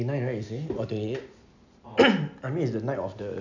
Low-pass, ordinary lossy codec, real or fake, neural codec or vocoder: 7.2 kHz; none; real; none